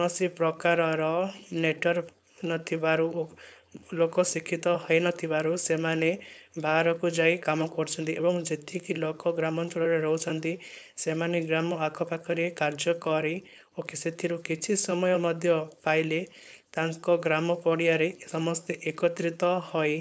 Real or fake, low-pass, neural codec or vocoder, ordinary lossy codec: fake; none; codec, 16 kHz, 4.8 kbps, FACodec; none